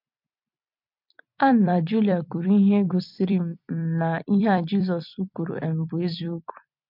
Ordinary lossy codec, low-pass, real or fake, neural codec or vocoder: MP3, 48 kbps; 5.4 kHz; fake; vocoder, 44.1 kHz, 128 mel bands every 256 samples, BigVGAN v2